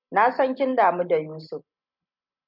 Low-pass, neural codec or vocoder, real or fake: 5.4 kHz; none; real